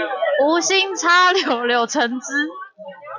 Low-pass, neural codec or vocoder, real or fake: 7.2 kHz; none; real